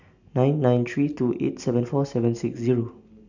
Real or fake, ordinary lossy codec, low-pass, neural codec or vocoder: real; none; 7.2 kHz; none